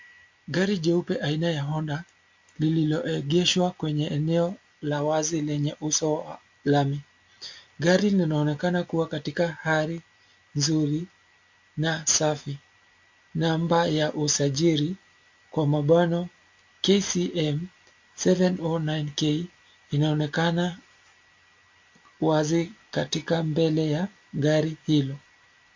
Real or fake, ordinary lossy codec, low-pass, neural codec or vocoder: real; MP3, 48 kbps; 7.2 kHz; none